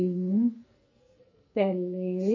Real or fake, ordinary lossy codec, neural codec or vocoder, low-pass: fake; MP3, 32 kbps; codec, 44.1 kHz, 2.6 kbps, SNAC; 7.2 kHz